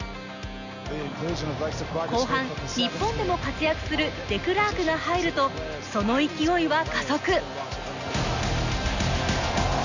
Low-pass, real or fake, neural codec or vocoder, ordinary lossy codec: 7.2 kHz; real; none; none